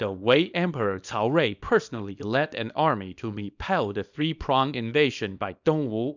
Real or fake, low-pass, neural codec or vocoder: fake; 7.2 kHz; codec, 24 kHz, 0.9 kbps, WavTokenizer, small release